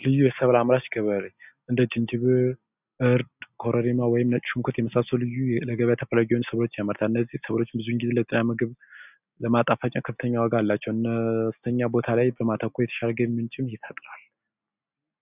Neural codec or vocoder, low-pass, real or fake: none; 3.6 kHz; real